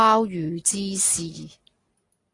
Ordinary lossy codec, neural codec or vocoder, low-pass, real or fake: AAC, 32 kbps; none; 10.8 kHz; real